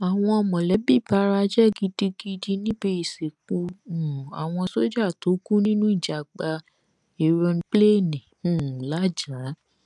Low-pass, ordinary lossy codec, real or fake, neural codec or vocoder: 10.8 kHz; none; real; none